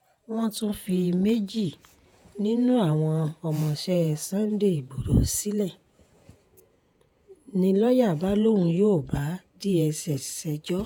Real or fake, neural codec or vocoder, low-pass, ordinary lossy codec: fake; vocoder, 48 kHz, 128 mel bands, Vocos; none; none